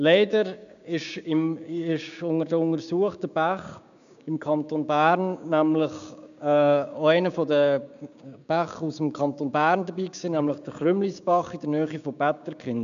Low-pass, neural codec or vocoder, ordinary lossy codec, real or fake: 7.2 kHz; codec, 16 kHz, 6 kbps, DAC; none; fake